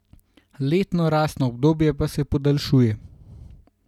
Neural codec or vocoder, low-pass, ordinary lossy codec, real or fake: none; 19.8 kHz; none; real